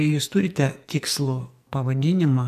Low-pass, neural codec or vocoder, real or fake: 14.4 kHz; codec, 44.1 kHz, 2.6 kbps, SNAC; fake